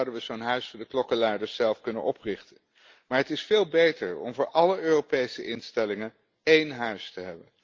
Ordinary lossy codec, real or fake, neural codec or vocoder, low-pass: Opus, 24 kbps; real; none; 7.2 kHz